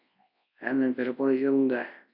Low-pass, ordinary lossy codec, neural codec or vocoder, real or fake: 5.4 kHz; MP3, 48 kbps; codec, 24 kHz, 0.9 kbps, WavTokenizer, large speech release; fake